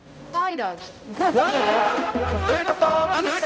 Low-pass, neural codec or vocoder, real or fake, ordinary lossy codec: none; codec, 16 kHz, 0.5 kbps, X-Codec, HuBERT features, trained on balanced general audio; fake; none